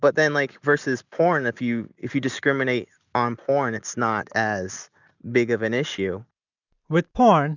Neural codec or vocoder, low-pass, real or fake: none; 7.2 kHz; real